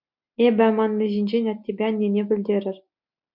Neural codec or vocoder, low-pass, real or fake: none; 5.4 kHz; real